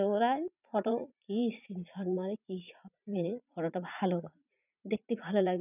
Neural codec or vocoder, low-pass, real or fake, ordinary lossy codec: vocoder, 44.1 kHz, 80 mel bands, Vocos; 3.6 kHz; fake; none